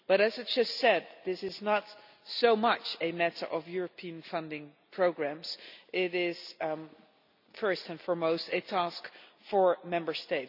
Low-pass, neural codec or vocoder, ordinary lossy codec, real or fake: 5.4 kHz; none; none; real